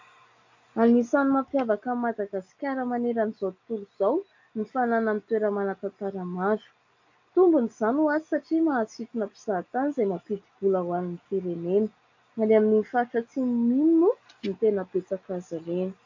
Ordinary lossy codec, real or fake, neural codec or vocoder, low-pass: AAC, 48 kbps; real; none; 7.2 kHz